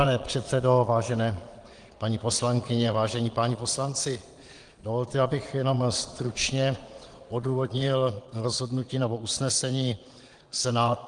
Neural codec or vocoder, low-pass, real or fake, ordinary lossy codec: vocoder, 22.05 kHz, 80 mel bands, Vocos; 9.9 kHz; fake; Opus, 24 kbps